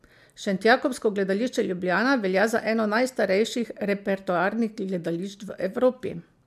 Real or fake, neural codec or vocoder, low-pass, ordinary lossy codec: real; none; 14.4 kHz; MP3, 96 kbps